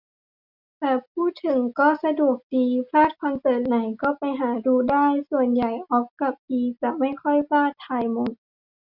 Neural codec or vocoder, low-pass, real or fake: none; 5.4 kHz; real